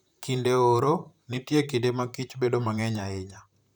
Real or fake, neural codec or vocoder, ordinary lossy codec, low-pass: fake; vocoder, 44.1 kHz, 128 mel bands, Pupu-Vocoder; none; none